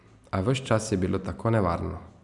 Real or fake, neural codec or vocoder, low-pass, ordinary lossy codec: real; none; 10.8 kHz; none